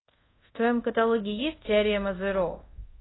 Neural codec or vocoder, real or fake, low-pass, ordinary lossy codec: codec, 24 kHz, 0.5 kbps, DualCodec; fake; 7.2 kHz; AAC, 16 kbps